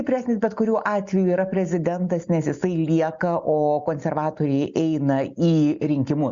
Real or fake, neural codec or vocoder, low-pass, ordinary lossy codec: real; none; 7.2 kHz; Opus, 64 kbps